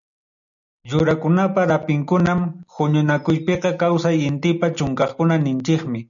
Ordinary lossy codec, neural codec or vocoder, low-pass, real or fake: MP3, 96 kbps; none; 7.2 kHz; real